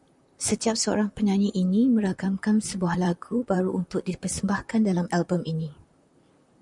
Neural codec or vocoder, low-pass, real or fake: vocoder, 44.1 kHz, 128 mel bands, Pupu-Vocoder; 10.8 kHz; fake